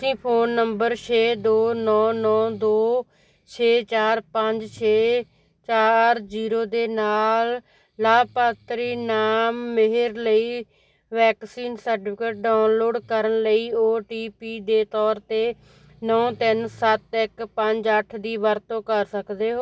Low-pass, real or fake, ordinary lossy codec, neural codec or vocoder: none; real; none; none